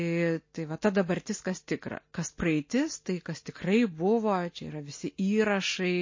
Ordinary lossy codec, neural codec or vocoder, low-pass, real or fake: MP3, 32 kbps; none; 7.2 kHz; real